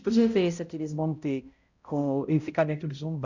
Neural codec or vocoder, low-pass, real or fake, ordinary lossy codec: codec, 16 kHz, 0.5 kbps, X-Codec, HuBERT features, trained on balanced general audio; 7.2 kHz; fake; Opus, 64 kbps